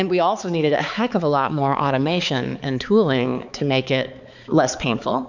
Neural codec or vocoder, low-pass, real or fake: codec, 16 kHz, 4 kbps, X-Codec, HuBERT features, trained on balanced general audio; 7.2 kHz; fake